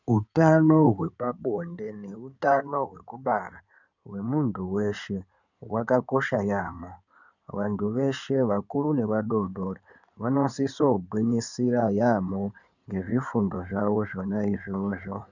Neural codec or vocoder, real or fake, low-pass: codec, 16 kHz in and 24 kHz out, 2.2 kbps, FireRedTTS-2 codec; fake; 7.2 kHz